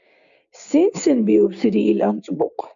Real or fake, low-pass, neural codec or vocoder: fake; 7.2 kHz; codec, 16 kHz, 6 kbps, DAC